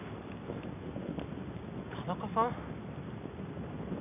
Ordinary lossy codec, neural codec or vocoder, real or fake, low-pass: none; none; real; 3.6 kHz